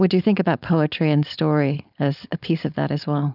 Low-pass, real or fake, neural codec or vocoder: 5.4 kHz; fake; codec, 16 kHz, 4.8 kbps, FACodec